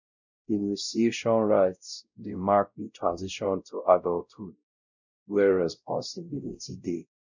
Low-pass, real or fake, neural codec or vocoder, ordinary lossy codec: 7.2 kHz; fake; codec, 16 kHz, 0.5 kbps, X-Codec, WavLM features, trained on Multilingual LibriSpeech; none